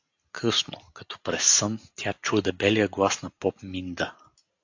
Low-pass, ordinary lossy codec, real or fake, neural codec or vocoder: 7.2 kHz; AAC, 48 kbps; real; none